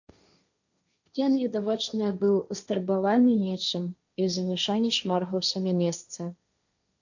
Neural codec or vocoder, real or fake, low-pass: codec, 16 kHz, 1.1 kbps, Voila-Tokenizer; fake; 7.2 kHz